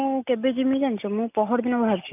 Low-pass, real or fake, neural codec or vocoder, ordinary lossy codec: 3.6 kHz; real; none; none